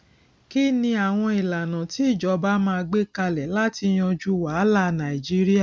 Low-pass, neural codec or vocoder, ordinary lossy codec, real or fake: none; none; none; real